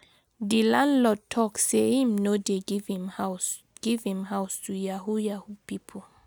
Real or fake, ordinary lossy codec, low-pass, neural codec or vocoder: real; none; none; none